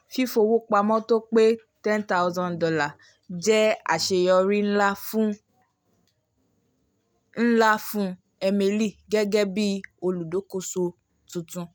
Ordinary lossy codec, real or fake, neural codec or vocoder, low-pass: none; real; none; none